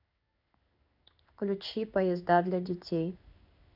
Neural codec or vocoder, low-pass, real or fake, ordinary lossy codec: codec, 16 kHz in and 24 kHz out, 1 kbps, XY-Tokenizer; 5.4 kHz; fake; none